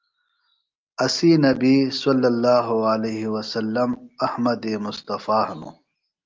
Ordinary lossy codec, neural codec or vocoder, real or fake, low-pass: Opus, 24 kbps; none; real; 7.2 kHz